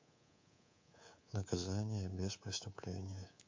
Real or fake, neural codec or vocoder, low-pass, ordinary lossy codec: fake; codec, 24 kHz, 3.1 kbps, DualCodec; 7.2 kHz; MP3, 48 kbps